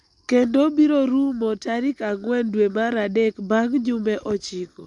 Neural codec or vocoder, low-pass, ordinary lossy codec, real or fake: none; 10.8 kHz; MP3, 64 kbps; real